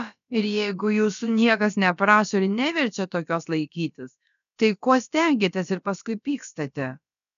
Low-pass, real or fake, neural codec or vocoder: 7.2 kHz; fake; codec, 16 kHz, about 1 kbps, DyCAST, with the encoder's durations